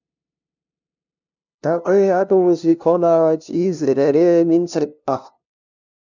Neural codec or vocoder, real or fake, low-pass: codec, 16 kHz, 0.5 kbps, FunCodec, trained on LibriTTS, 25 frames a second; fake; 7.2 kHz